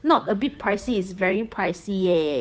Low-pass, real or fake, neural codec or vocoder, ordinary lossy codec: none; fake; codec, 16 kHz, 2 kbps, FunCodec, trained on Chinese and English, 25 frames a second; none